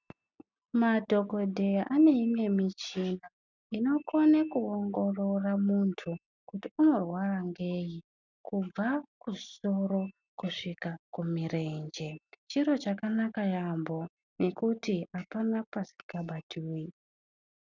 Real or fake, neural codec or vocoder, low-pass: real; none; 7.2 kHz